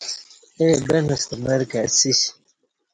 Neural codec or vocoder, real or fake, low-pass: none; real; 9.9 kHz